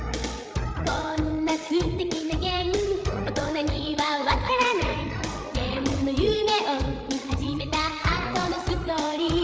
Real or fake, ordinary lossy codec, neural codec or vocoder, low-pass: fake; none; codec, 16 kHz, 8 kbps, FreqCodec, larger model; none